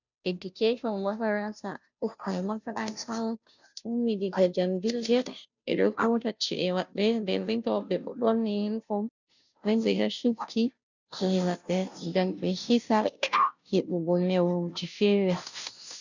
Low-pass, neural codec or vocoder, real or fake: 7.2 kHz; codec, 16 kHz, 0.5 kbps, FunCodec, trained on Chinese and English, 25 frames a second; fake